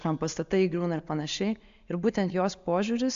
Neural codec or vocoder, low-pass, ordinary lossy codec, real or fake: none; 7.2 kHz; AAC, 64 kbps; real